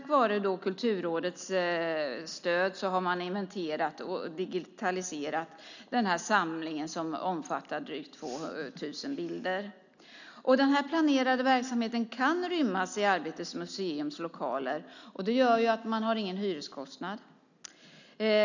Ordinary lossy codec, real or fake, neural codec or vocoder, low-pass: none; real; none; 7.2 kHz